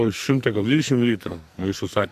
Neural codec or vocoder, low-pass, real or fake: codec, 44.1 kHz, 2.6 kbps, SNAC; 14.4 kHz; fake